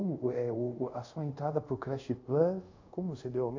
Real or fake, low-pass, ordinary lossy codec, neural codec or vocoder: fake; 7.2 kHz; AAC, 48 kbps; codec, 24 kHz, 0.5 kbps, DualCodec